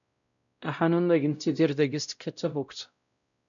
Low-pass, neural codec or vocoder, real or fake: 7.2 kHz; codec, 16 kHz, 0.5 kbps, X-Codec, WavLM features, trained on Multilingual LibriSpeech; fake